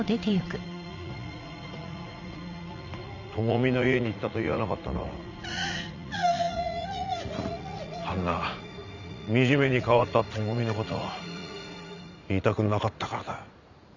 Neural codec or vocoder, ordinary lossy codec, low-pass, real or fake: vocoder, 44.1 kHz, 80 mel bands, Vocos; none; 7.2 kHz; fake